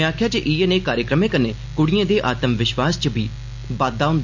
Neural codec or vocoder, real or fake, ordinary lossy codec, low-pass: none; real; MP3, 64 kbps; 7.2 kHz